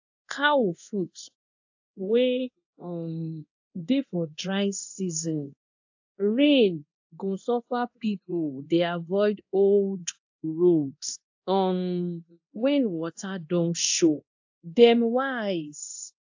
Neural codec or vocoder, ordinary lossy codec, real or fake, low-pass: codec, 24 kHz, 0.9 kbps, DualCodec; AAC, 48 kbps; fake; 7.2 kHz